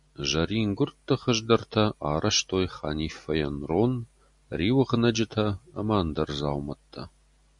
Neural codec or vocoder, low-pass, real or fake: none; 10.8 kHz; real